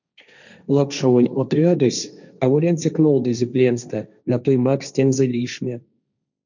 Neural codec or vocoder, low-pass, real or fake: codec, 16 kHz, 1.1 kbps, Voila-Tokenizer; 7.2 kHz; fake